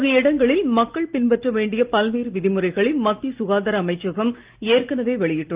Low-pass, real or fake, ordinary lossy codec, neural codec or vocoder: 3.6 kHz; real; Opus, 32 kbps; none